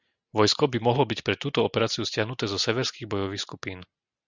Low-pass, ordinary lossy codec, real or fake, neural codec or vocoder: 7.2 kHz; Opus, 64 kbps; real; none